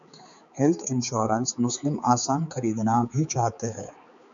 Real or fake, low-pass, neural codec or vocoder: fake; 7.2 kHz; codec, 16 kHz, 4 kbps, X-Codec, HuBERT features, trained on balanced general audio